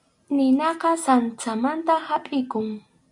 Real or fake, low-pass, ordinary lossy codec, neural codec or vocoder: real; 10.8 kHz; MP3, 64 kbps; none